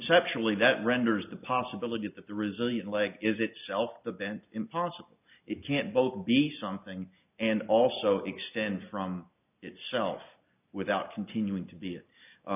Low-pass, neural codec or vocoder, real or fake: 3.6 kHz; none; real